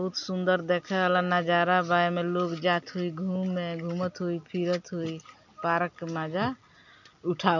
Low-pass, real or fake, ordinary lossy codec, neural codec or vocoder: 7.2 kHz; real; none; none